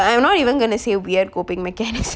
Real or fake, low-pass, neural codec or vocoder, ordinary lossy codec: real; none; none; none